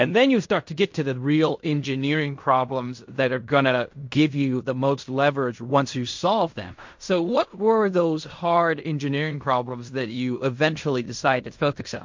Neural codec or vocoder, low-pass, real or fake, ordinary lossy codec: codec, 16 kHz in and 24 kHz out, 0.4 kbps, LongCat-Audio-Codec, fine tuned four codebook decoder; 7.2 kHz; fake; MP3, 48 kbps